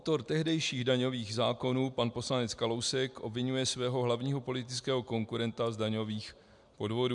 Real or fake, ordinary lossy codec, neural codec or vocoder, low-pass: real; MP3, 96 kbps; none; 10.8 kHz